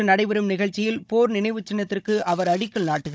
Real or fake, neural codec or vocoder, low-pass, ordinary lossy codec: fake; codec, 16 kHz, 16 kbps, FunCodec, trained on Chinese and English, 50 frames a second; none; none